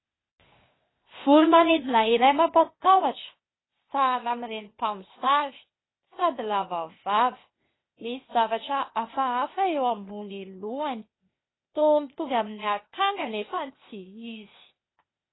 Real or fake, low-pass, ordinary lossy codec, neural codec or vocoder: fake; 7.2 kHz; AAC, 16 kbps; codec, 16 kHz, 0.8 kbps, ZipCodec